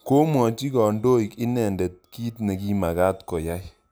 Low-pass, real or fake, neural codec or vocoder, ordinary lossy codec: none; real; none; none